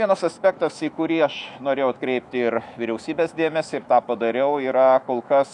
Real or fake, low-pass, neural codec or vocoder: fake; 10.8 kHz; autoencoder, 48 kHz, 128 numbers a frame, DAC-VAE, trained on Japanese speech